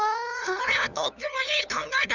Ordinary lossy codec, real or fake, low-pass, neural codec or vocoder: none; fake; 7.2 kHz; codec, 16 kHz, 2 kbps, FunCodec, trained on LibriTTS, 25 frames a second